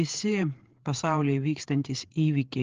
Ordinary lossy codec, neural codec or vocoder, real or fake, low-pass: Opus, 16 kbps; codec, 16 kHz, 8 kbps, FreqCodec, larger model; fake; 7.2 kHz